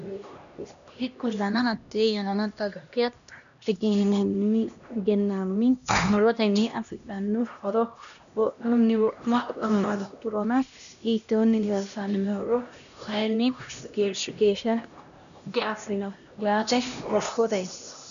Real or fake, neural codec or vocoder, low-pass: fake; codec, 16 kHz, 1 kbps, X-Codec, HuBERT features, trained on LibriSpeech; 7.2 kHz